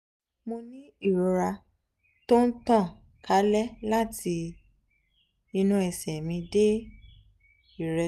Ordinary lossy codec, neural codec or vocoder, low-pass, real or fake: none; none; 14.4 kHz; real